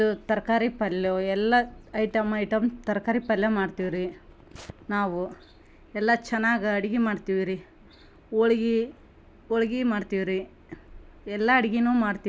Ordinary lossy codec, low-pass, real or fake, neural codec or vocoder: none; none; real; none